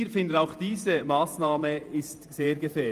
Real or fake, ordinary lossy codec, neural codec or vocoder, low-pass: fake; Opus, 32 kbps; vocoder, 48 kHz, 128 mel bands, Vocos; 14.4 kHz